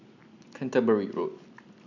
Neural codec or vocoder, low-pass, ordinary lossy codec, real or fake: none; 7.2 kHz; none; real